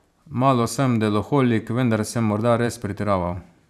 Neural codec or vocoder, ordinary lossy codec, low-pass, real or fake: vocoder, 44.1 kHz, 128 mel bands every 512 samples, BigVGAN v2; none; 14.4 kHz; fake